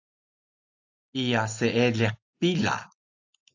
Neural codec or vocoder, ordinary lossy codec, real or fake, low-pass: none; AAC, 48 kbps; real; 7.2 kHz